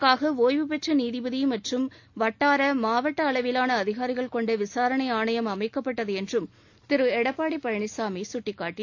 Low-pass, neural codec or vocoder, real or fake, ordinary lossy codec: 7.2 kHz; none; real; AAC, 48 kbps